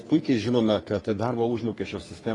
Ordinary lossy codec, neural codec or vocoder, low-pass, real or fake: AAC, 32 kbps; codec, 44.1 kHz, 3.4 kbps, Pupu-Codec; 10.8 kHz; fake